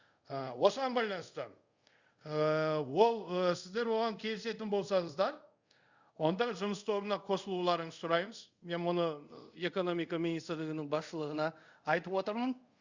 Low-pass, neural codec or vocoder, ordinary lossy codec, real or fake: 7.2 kHz; codec, 24 kHz, 0.5 kbps, DualCodec; Opus, 64 kbps; fake